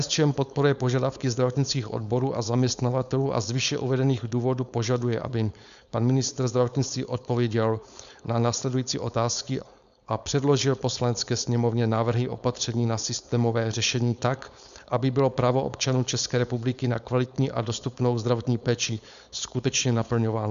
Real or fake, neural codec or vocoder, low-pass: fake; codec, 16 kHz, 4.8 kbps, FACodec; 7.2 kHz